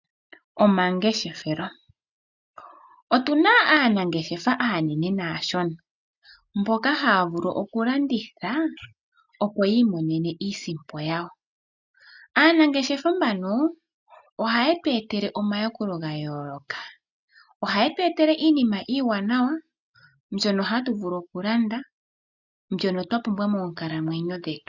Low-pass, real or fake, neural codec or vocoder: 7.2 kHz; real; none